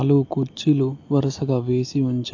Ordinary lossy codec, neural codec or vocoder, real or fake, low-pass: AAC, 48 kbps; none; real; 7.2 kHz